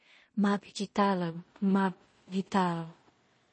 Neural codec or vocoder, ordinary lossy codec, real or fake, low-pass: codec, 16 kHz in and 24 kHz out, 0.4 kbps, LongCat-Audio-Codec, two codebook decoder; MP3, 32 kbps; fake; 9.9 kHz